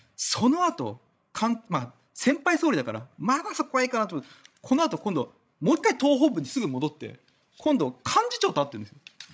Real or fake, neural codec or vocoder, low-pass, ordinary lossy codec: fake; codec, 16 kHz, 16 kbps, FreqCodec, larger model; none; none